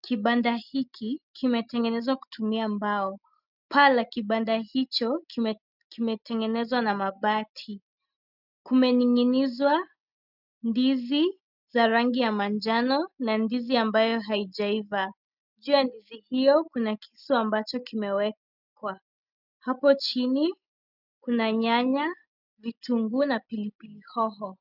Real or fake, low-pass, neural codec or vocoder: real; 5.4 kHz; none